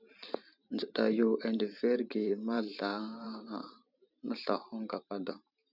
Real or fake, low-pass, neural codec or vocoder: fake; 5.4 kHz; vocoder, 44.1 kHz, 128 mel bands every 512 samples, BigVGAN v2